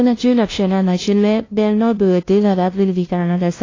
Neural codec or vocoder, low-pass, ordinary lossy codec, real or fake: codec, 16 kHz, 0.5 kbps, FunCodec, trained on LibriTTS, 25 frames a second; 7.2 kHz; AAC, 32 kbps; fake